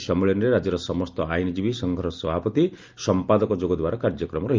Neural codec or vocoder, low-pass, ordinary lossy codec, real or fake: none; 7.2 kHz; Opus, 24 kbps; real